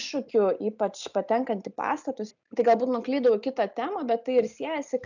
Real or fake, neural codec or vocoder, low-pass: real; none; 7.2 kHz